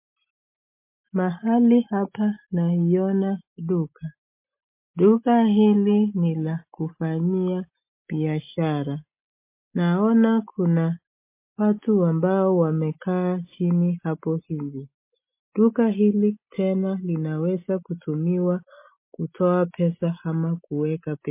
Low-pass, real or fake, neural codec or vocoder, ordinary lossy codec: 3.6 kHz; real; none; MP3, 24 kbps